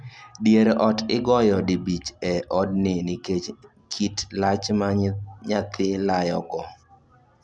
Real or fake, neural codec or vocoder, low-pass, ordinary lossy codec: real; none; none; none